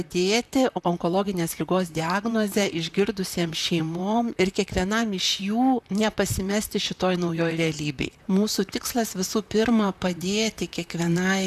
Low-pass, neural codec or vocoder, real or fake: 14.4 kHz; vocoder, 44.1 kHz, 128 mel bands, Pupu-Vocoder; fake